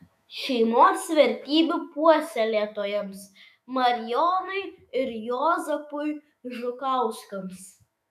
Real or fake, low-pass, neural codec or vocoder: fake; 14.4 kHz; autoencoder, 48 kHz, 128 numbers a frame, DAC-VAE, trained on Japanese speech